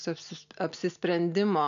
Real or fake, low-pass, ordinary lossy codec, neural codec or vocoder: real; 7.2 kHz; AAC, 96 kbps; none